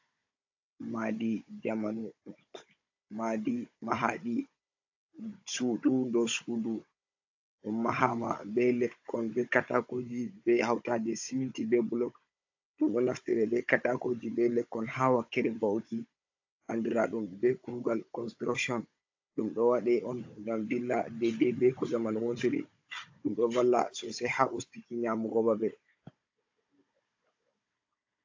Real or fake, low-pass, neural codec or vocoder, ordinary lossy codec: fake; 7.2 kHz; codec, 16 kHz, 16 kbps, FunCodec, trained on Chinese and English, 50 frames a second; AAC, 48 kbps